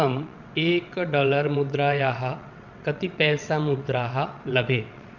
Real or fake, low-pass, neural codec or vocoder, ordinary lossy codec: fake; 7.2 kHz; vocoder, 22.05 kHz, 80 mel bands, WaveNeXt; none